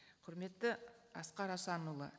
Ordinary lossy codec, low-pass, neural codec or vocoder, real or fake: none; none; none; real